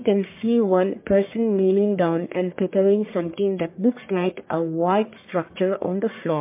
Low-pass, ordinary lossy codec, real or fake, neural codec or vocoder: 3.6 kHz; MP3, 24 kbps; fake; codec, 44.1 kHz, 1.7 kbps, Pupu-Codec